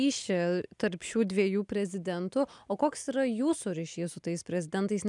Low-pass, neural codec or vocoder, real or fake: 10.8 kHz; none; real